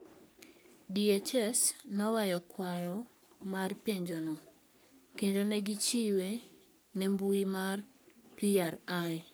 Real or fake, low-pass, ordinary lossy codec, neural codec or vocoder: fake; none; none; codec, 44.1 kHz, 3.4 kbps, Pupu-Codec